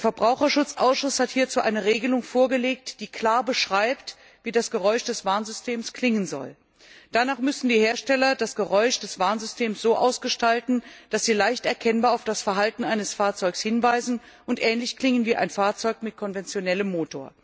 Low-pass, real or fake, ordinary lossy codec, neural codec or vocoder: none; real; none; none